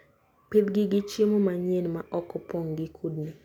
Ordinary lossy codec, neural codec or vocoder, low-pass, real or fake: none; none; 19.8 kHz; real